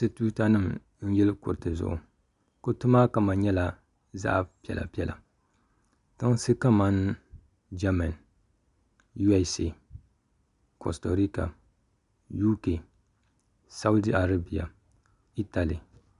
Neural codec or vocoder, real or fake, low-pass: none; real; 10.8 kHz